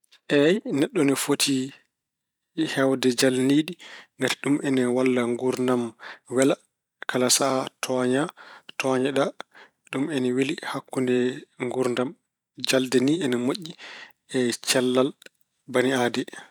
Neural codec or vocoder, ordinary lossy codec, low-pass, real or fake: vocoder, 44.1 kHz, 128 mel bands every 512 samples, BigVGAN v2; none; 19.8 kHz; fake